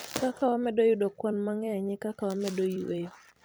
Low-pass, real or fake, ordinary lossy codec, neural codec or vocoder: none; fake; none; vocoder, 44.1 kHz, 128 mel bands every 256 samples, BigVGAN v2